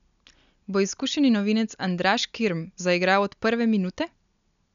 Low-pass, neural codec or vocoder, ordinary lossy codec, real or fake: 7.2 kHz; none; MP3, 96 kbps; real